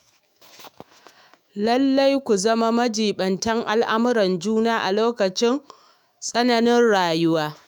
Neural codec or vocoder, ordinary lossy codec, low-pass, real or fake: autoencoder, 48 kHz, 128 numbers a frame, DAC-VAE, trained on Japanese speech; none; none; fake